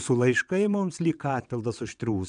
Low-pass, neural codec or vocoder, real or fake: 9.9 kHz; vocoder, 22.05 kHz, 80 mel bands, WaveNeXt; fake